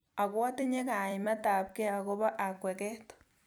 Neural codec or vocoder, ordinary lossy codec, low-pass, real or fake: vocoder, 44.1 kHz, 128 mel bands every 256 samples, BigVGAN v2; none; none; fake